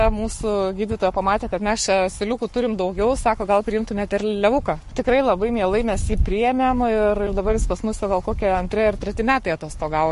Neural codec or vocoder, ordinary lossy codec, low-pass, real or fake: codec, 44.1 kHz, 7.8 kbps, Pupu-Codec; MP3, 48 kbps; 14.4 kHz; fake